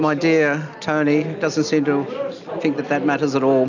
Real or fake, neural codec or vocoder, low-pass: real; none; 7.2 kHz